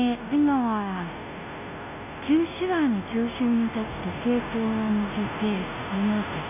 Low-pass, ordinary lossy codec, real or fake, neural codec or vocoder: 3.6 kHz; none; fake; codec, 16 kHz, 0.5 kbps, FunCodec, trained on Chinese and English, 25 frames a second